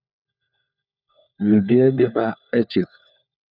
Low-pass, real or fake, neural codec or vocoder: 5.4 kHz; fake; codec, 16 kHz, 4 kbps, FunCodec, trained on LibriTTS, 50 frames a second